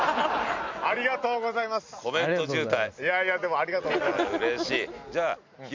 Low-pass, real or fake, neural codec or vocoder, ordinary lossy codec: 7.2 kHz; real; none; MP3, 64 kbps